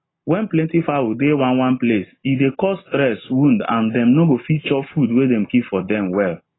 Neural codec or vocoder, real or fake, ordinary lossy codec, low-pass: none; real; AAC, 16 kbps; 7.2 kHz